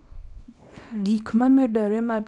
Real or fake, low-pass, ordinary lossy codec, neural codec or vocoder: fake; 10.8 kHz; MP3, 96 kbps; codec, 24 kHz, 0.9 kbps, WavTokenizer, small release